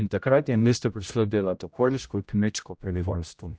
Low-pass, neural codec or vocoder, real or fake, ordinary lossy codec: none; codec, 16 kHz, 0.5 kbps, X-Codec, HuBERT features, trained on general audio; fake; none